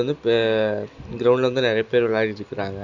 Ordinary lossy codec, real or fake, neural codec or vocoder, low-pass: none; real; none; 7.2 kHz